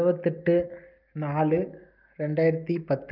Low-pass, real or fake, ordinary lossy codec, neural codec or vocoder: 5.4 kHz; real; Opus, 24 kbps; none